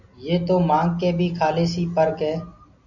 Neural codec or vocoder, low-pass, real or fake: none; 7.2 kHz; real